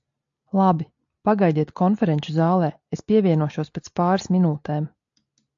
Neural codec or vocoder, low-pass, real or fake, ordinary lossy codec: none; 7.2 kHz; real; AAC, 64 kbps